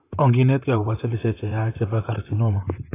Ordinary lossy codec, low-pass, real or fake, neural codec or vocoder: AAC, 16 kbps; 3.6 kHz; fake; vocoder, 44.1 kHz, 128 mel bands, Pupu-Vocoder